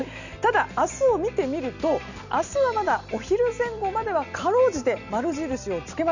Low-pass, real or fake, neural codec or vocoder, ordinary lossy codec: 7.2 kHz; real; none; none